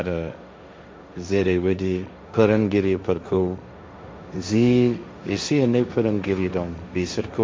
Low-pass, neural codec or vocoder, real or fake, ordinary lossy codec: none; codec, 16 kHz, 1.1 kbps, Voila-Tokenizer; fake; none